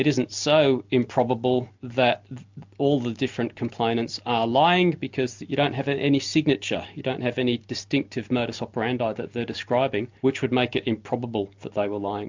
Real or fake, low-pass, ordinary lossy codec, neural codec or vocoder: real; 7.2 kHz; MP3, 64 kbps; none